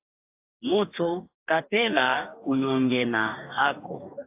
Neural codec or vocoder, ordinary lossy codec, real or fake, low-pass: codec, 44.1 kHz, 2.6 kbps, DAC; AAC, 32 kbps; fake; 3.6 kHz